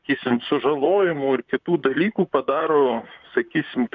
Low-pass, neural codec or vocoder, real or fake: 7.2 kHz; vocoder, 44.1 kHz, 128 mel bands, Pupu-Vocoder; fake